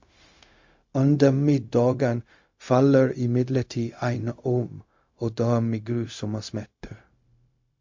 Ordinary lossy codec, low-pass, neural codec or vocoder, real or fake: MP3, 48 kbps; 7.2 kHz; codec, 16 kHz, 0.4 kbps, LongCat-Audio-Codec; fake